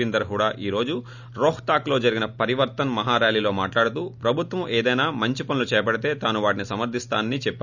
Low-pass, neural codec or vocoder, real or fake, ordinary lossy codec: 7.2 kHz; none; real; none